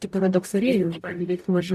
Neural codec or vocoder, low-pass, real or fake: codec, 44.1 kHz, 0.9 kbps, DAC; 14.4 kHz; fake